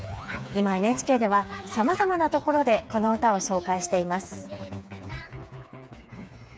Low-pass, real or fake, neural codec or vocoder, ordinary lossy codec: none; fake; codec, 16 kHz, 4 kbps, FreqCodec, smaller model; none